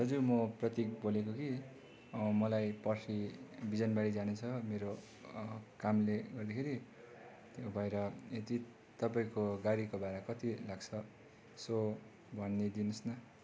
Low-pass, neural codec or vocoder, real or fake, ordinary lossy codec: none; none; real; none